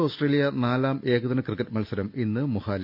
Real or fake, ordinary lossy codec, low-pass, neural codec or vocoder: real; none; 5.4 kHz; none